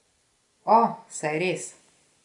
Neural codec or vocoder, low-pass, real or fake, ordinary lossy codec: none; 10.8 kHz; real; none